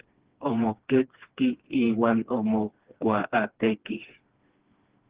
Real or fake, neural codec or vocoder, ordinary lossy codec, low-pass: fake; codec, 16 kHz, 2 kbps, FreqCodec, smaller model; Opus, 16 kbps; 3.6 kHz